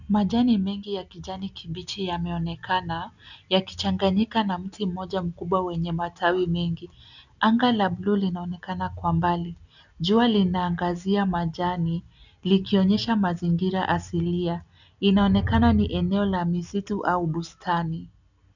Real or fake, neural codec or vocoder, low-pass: real; none; 7.2 kHz